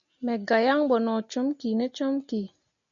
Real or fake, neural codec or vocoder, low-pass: real; none; 7.2 kHz